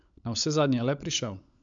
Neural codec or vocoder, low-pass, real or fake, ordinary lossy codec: codec, 16 kHz, 16 kbps, FunCodec, trained on LibriTTS, 50 frames a second; 7.2 kHz; fake; MP3, 64 kbps